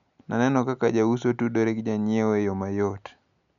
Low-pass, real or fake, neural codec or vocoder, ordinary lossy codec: 7.2 kHz; real; none; none